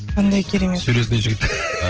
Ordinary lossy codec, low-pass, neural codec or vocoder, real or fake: Opus, 16 kbps; 7.2 kHz; none; real